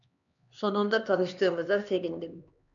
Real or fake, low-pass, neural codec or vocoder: fake; 7.2 kHz; codec, 16 kHz, 2 kbps, X-Codec, HuBERT features, trained on LibriSpeech